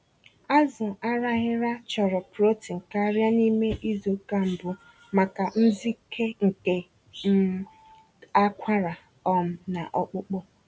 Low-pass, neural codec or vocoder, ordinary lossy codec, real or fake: none; none; none; real